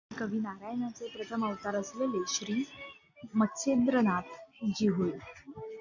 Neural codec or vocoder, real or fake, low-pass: none; real; 7.2 kHz